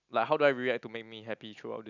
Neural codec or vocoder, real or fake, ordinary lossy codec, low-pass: none; real; none; 7.2 kHz